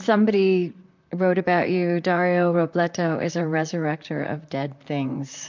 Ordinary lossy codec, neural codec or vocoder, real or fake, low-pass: MP3, 64 kbps; vocoder, 44.1 kHz, 128 mel bands, Pupu-Vocoder; fake; 7.2 kHz